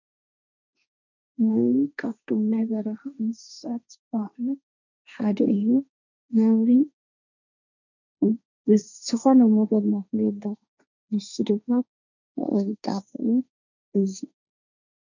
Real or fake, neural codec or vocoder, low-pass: fake; codec, 16 kHz, 1.1 kbps, Voila-Tokenizer; 7.2 kHz